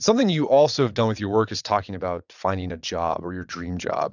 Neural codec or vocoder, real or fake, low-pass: vocoder, 22.05 kHz, 80 mel bands, WaveNeXt; fake; 7.2 kHz